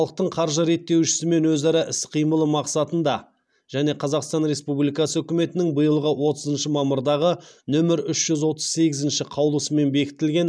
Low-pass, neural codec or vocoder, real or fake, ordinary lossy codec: none; none; real; none